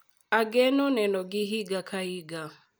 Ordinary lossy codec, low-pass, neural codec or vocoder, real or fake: none; none; none; real